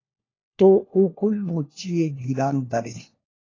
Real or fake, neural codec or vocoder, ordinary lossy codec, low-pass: fake; codec, 16 kHz, 1 kbps, FunCodec, trained on LibriTTS, 50 frames a second; AAC, 32 kbps; 7.2 kHz